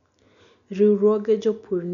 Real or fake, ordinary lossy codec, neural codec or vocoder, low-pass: real; none; none; 7.2 kHz